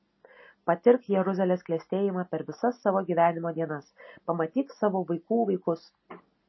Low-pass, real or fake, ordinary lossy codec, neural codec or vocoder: 7.2 kHz; real; MP3, 24 kbps; none